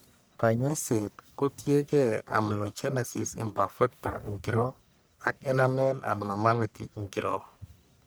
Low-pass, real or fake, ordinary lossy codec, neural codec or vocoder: none; fake; none; codec, 44.1 kHz, 1.7 kbps, Pupu-Codec